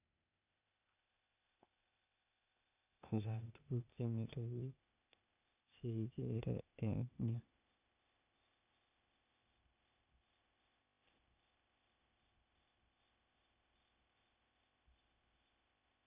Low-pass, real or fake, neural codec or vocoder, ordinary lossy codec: 3.6 kHz; fake; codec, 16 kHz, 0.8 kbps, ZipCodec; none